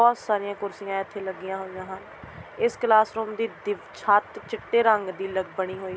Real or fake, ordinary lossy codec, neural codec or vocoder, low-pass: real; none; none; none